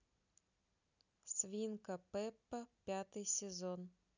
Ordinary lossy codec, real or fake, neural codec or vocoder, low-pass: none; real; none; 7.2 kHz